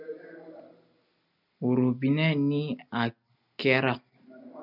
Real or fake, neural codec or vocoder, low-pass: real; none; 5.4 kHz